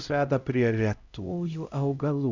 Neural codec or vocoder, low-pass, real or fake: codec, 16 kHz, 0.5 kbps, X-Codec, HuBERT features, trained on LibriSpeech; 7.2 kHz; fake